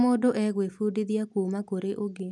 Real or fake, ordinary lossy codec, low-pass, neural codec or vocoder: real; none; none; none